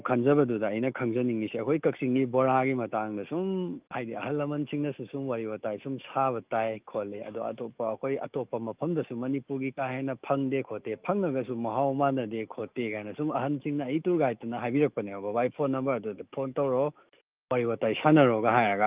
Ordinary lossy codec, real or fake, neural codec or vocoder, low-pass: Opus, 32 kbps; fake; autoencoder, 48 kHz, 128 numbers a frame, DAC-VAE, trained on Japanese speech; 3.6 kHz